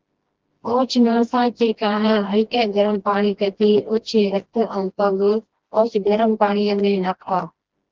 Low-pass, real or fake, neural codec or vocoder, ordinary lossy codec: 7.2 kHz; fake; codec, 16 kHz, 1 kbps, FreqCodec, smaller model; Opus, 16 kbps